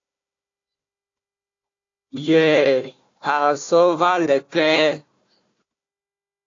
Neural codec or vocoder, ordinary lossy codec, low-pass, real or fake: codec, 16 kHz, 1 kbps, FunCodec, trained on Chinese and English, 50 frames a second; AAC, 48 kbps; 7.2 kHz; fake